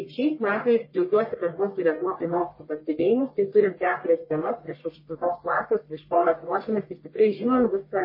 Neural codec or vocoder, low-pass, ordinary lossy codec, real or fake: codec, 44.1 kHz, 1.7 kbps, Pupu-Codec; 5.4 kHz; MP3, 24 kbps; fake